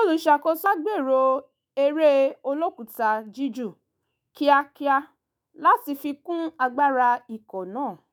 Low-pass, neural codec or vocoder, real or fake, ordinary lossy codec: none; autoencoder, 48 kHz, 128 numbers a frame, DAC-VAE, trained on Japanese speech; fake; none